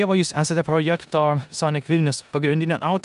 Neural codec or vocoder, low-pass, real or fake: codec, 16 kHz in and 24 kHz out, 0.9 kbps, LongCat-Audio-Codec, four codebook decoder; 10.8 kHz; fake